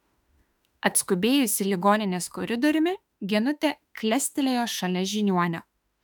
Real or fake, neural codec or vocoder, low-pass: fake; autoencoder, 48 kHz, 32 numbers a frame, DAC-VAE, trained on Japanese speech; 19.8 kHz